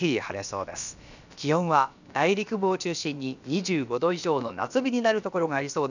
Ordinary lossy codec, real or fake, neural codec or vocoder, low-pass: none; fake; codec, 16 kHz, about 1 kbps, DyCAST, with the encoder's durations; 7.2 kHz